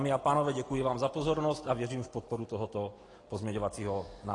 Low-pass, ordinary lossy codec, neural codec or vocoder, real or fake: 10.8 kHz; AAC, 32 kbps; none; real